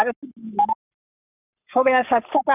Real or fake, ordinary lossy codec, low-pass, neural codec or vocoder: fake; none; 3.6 kHz; codec, 44.1 kHz, 7.8 kbps, Pupu-Codec